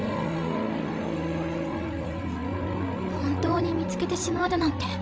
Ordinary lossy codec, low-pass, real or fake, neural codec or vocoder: none; none; fake; codec, 16 kHz, 16 kbps, FreqCodec, larger model